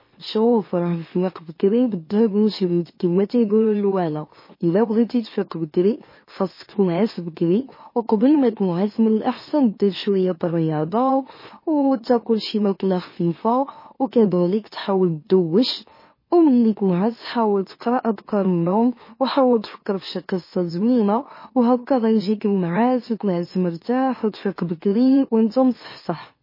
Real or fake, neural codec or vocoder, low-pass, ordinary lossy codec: fake; autoencoder, 44.1 kHz, a latent of 192 numbers a frame, MeloTTS; 5.4 kHz; MP3, 24 kbps